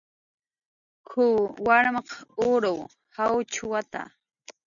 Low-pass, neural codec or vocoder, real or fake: 7.2 kHz; none; real